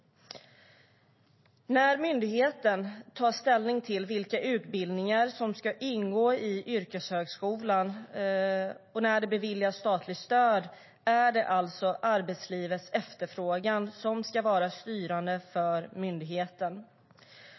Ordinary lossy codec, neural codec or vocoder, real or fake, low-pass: MP3, 24 kbps; none; real; 7.2 kHz